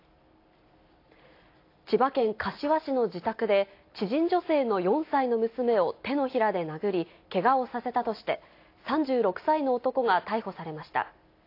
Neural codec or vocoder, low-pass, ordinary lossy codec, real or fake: none; 5.4 kHz; AAC, 32 kbps; real